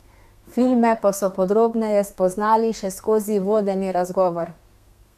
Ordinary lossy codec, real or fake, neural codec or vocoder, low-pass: none; fake; codec, 32 kHz, 1.9 kbps, SNAC; 14.4 kHz